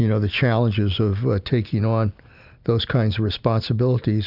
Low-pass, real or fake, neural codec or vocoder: 5.4 kHz; real; none